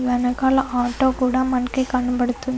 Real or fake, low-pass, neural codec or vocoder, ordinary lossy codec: real; none; none; none